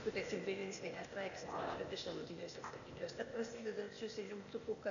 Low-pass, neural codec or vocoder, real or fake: 7.2 kHz; codec, 16 kHz, 0.8 kbps, ZipCodec; fake